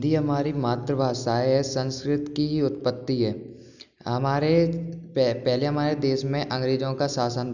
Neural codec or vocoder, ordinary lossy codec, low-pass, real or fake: none; none; 7.2 kHz; real